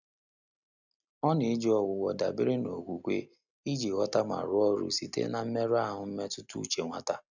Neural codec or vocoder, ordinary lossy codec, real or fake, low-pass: none; none; real; 7.2 kHz